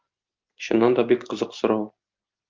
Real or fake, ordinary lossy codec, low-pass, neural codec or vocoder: real; Opus, 32 kbps; 7.2 kHz; none